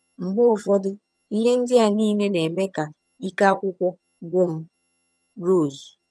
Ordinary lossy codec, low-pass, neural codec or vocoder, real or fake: none; none; vocoder, 22.05 kHz, 80 mel bands, HiFi-GAN; fake